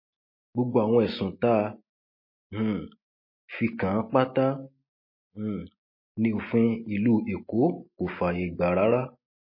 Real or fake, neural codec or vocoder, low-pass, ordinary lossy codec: real; none; 5.4 kHz; MP3, 24 kbps